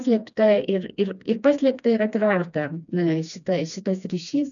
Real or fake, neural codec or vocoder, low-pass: fake; codec, 16 kHz, 2 kbps, FreqCodec, smaller model; 7.2 kHz